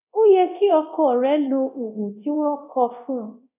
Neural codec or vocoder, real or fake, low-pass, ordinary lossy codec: codec, 24 kHz, 0.9 kbps, DualCodec; fake; 3.6 kHz; none